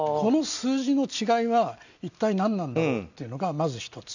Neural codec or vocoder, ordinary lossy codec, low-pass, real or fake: none; none; 7.2 kHz; real